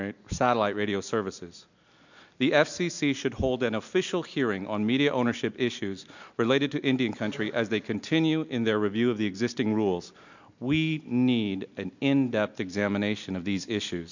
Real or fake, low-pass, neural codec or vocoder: real; 7.2 kHz; none